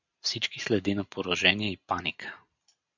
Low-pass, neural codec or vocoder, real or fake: 7.2 kHz; none; real